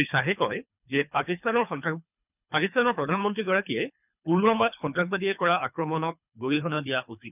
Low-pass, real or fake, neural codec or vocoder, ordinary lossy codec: 3.6 kHz; fake; codec, 24 kHz, 3 kbps, HILCodec; none